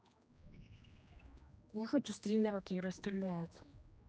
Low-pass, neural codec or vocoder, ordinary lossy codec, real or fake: none; codec, 16 kHz, 1 kbps, X-Codec, HuBERT features, trained on general audio; none; fake